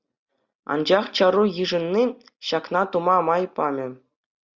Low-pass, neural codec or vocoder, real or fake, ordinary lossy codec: 7.2 kHz; none; real; Opus, 64 kbps